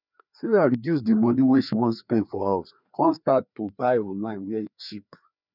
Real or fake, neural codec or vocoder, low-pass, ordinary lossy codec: fake; codec, 16 kHz, 2 kbps, FreqCodec, larger model; 5.4 kHz; none